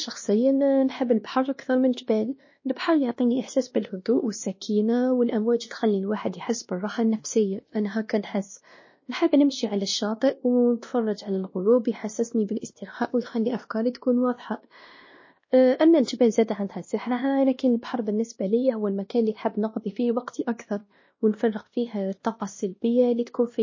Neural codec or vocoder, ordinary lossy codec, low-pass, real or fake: codec, 16 kHz, 1 kbps, X-Codec, WavLM features, trained on Multilingual LibriSpeech; MP3, 32 kbps; 7.2 kHz; fake